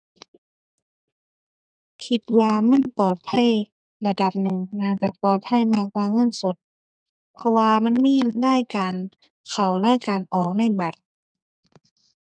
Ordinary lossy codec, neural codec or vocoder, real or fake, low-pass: none; codec, 32 kHz, 1.9 kbps, SNAC; fake; 9.9 kHz